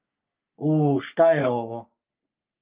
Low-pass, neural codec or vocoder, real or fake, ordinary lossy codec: 3.6 kHz; codec, 44.1 kHz, 3.4 kbps, Pupu-Codec; fake; Opus, 24 kbps